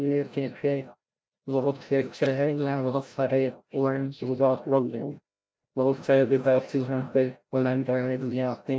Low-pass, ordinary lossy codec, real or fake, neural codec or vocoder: none; none; fake; codec, 16 kHz, 0.5 kbps, FreqCodec, larger model